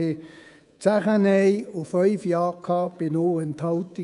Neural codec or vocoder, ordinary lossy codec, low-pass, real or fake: codec, 24 kHz, 3.1 kbps, DualCodec; none; 10.8 kHz; fake